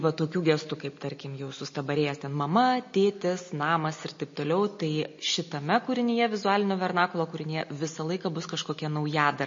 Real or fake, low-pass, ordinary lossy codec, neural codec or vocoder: real; 7.2 kHz; MP3, 32 kbps; none